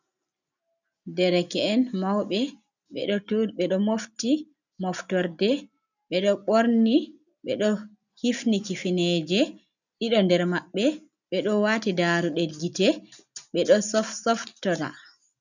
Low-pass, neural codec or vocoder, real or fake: 7.2 kHz; none; real